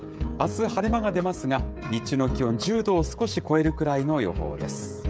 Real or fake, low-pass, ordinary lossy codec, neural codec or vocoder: fake; none; none; codec, 16 kHz, 16 kbps, FreqCodec, smaller model